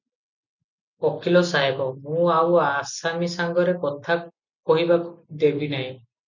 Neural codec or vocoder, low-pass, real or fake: none; 7.2 kHz; real